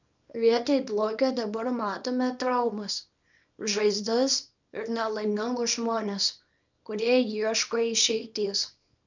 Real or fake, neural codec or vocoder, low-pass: fake; codec, 24 kHz, 0.9 kbps, WavTokenizer, small release; 7.2 kHz